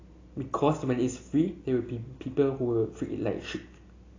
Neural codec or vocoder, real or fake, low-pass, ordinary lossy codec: none; real; 7.2 kHz; AAC, 32 kbps